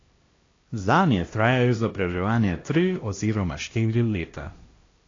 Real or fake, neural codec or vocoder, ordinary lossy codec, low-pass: fake; codec, 16 kHz, 1 kbps, X-Codec, HuBERT features, trained on LibriSpeech; AAC, 32 kbps; 7.2 kHz